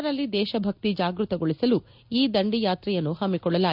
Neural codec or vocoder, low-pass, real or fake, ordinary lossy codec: none; 5.4 kHz; real; none